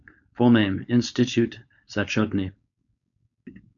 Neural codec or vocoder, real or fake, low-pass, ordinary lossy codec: codec, 16 kHz, 4.8 kbps, FACodec; fake; 7.2 kHz; MP3, 64 kbps